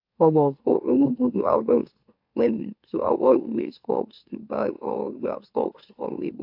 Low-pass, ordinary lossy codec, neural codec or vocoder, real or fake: 5.4 kHz; AAC, 48 kbps; autoencoder, 44.1 kHz, a latent of 192 numbers a frame, MeloTTS; fake